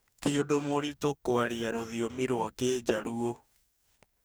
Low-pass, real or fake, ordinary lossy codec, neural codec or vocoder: none; fake; none; codec, 44.1 kHz, 2.6 kbps, DAC